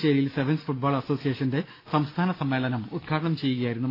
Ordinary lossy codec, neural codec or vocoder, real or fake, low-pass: AAC, 32 kbps; none; real; 5.4 kHz